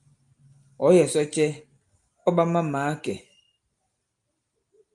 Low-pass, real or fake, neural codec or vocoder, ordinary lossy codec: 10.8 kHz; real; none; Opus, 32 kbps